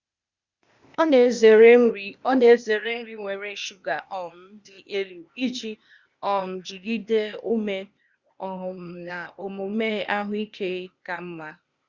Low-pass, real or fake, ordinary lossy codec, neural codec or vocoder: 7.2 kHz; fake; Opus, 64 kbps; codec, 16 kHz, 0.8 kbps, ZipCodec